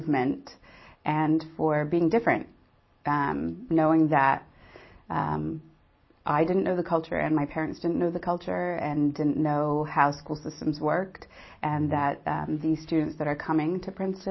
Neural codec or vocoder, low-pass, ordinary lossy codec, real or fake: none; 7.2 kHz; MP3, 24 kbps; real